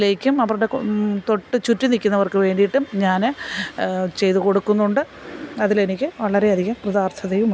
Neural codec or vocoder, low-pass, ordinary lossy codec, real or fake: none; none; none; real